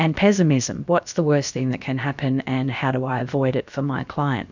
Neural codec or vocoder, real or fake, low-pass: codec, 16 kHz, about 1 kbps, DyCAST, with the encoder's durations; fake; 7.2 kHz